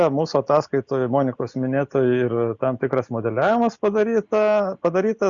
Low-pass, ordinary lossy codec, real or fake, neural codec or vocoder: 7.2 kHz; Opus, 64 kbps; real; none